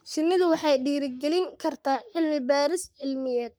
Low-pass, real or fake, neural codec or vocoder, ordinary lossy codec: none; fake; codec, 44.1 kHz, 3.4 kbps, Pupu-Codec; none